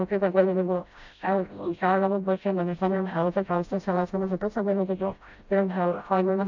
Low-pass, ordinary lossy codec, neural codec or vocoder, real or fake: 7.2 kHz; MP3, 64 kbps; codec, 16 kHz, 0.5 kbps, FreqCodec, smaller model; fake